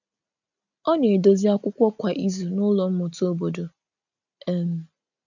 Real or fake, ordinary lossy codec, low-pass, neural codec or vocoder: real; none; 7.2 kHz; none